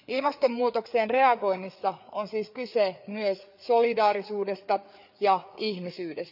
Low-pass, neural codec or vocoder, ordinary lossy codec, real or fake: 5.4 kHz; codec, 16 kHz, 4 kbps, FreqCodec, larger model; none; fake